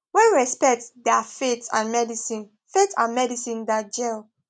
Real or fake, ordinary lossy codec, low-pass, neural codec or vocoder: real; none; none; none